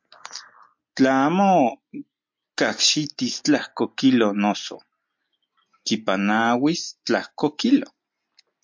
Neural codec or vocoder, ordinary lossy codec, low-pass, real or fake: none; MP3, 48 kbps; 7.2 kHz; real